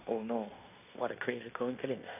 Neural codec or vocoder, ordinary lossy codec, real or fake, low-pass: codec, 16 kHz in and 24 kHz out, 0.9 kbps, LongCat-Audio-Codec, fine tuned four codebook decoder; AAC, 32 kbps; fake; 3.6 kHz